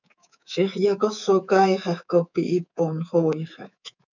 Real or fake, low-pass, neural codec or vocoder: fake; 7.2 kHz; codec, 24 kHz, 3.1 kbps, DualCodec